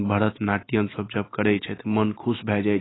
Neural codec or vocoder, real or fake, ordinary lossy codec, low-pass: none; real; AAC, 16 kbps; 7.2 kHz